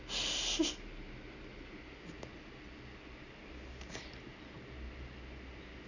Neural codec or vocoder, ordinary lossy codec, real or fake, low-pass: none; none; real; 7.2 kHz